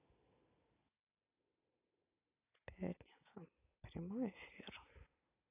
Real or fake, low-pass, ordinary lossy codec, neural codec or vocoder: real; 3.6 kHz; AAC, 24 kbps; none